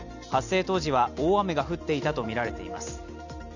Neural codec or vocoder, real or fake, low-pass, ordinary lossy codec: none; real; 7.2 kHz; none